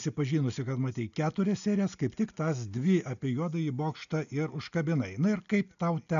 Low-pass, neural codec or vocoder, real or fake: 7.2 kHz; none; real